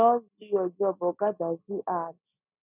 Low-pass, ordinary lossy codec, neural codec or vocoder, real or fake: 3.6 kHz; none; none; real